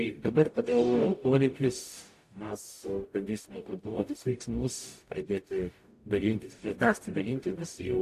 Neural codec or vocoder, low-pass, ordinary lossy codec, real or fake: codec, 44.1 kHz, 0.9 kbps, DAC; 14.4 kHz; AAC, 64 kbps; fake